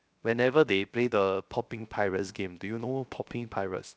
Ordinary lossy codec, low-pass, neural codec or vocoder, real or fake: none; none; codec, 16 kHz, 0.7 kbps, FocalCodec; fake